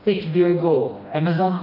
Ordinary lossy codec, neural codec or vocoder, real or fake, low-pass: AAC, 48 kbps; codec, 16 kHz, 1 kbps, FreqCodec, smaller model; fake; 5.4 kHz